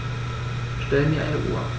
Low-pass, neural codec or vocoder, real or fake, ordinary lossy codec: none; none; real; none